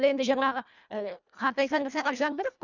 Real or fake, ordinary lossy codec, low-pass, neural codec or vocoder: fake; none; 7.2 kHz; codec, 24 kHz, 1.5 kbps, HILCodec